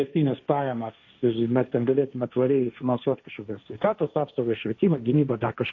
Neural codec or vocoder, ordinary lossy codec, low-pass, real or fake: codec, 16 kHz, 1.1 kbps, Voila-Tokenizer; MP3, 48 kbps; 7.2 kHz; fake